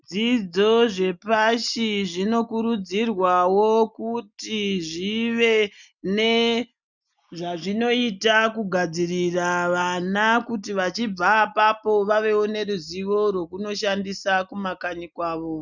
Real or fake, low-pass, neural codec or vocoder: real; 7.2 kHz; none